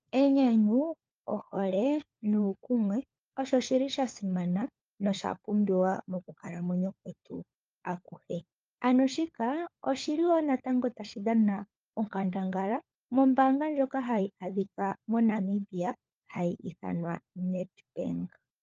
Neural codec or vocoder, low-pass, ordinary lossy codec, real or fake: codec, 16 kHz, 4 kbps, FunCodec, trained on LibriTTS, 50 frames a second; 7.2 kHz; Opus, 24 kbps; fake